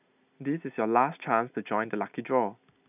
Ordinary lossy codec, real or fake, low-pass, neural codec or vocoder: none; real; 3.6 kHz; none